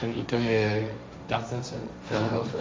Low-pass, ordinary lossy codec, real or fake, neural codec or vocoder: none; none; fake; codec, 16 kHz, 1.1 kbps, Voila-Tokenizer